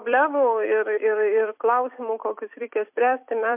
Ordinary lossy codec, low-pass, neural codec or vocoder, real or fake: MP3, 32 kbps; 3.6 kHz; vocoder, 44.1 kHz, 128 mel bands every 256 samples, BigVGAN v2; fake